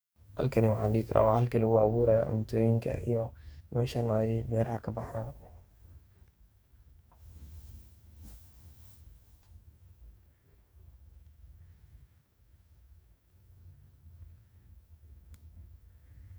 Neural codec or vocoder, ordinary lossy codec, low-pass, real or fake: codec, 44.1 kHz, 2.6 kbps, DAC; none; none; fake